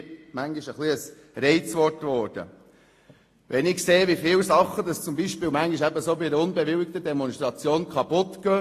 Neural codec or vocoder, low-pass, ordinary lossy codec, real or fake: none; 14.4 kHz; AAC, 48 kbps; real